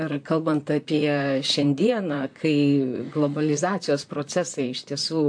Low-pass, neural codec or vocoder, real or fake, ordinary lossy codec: 9.9 kHz; vocoder, 44.1 kHz, 128 mel bands, Pupu-Vocoder; fake; AAC, 64 kbps